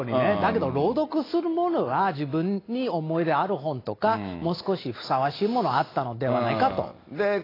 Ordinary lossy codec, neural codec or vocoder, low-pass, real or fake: AAC, 24 kbps; none; 5.4 kHz; real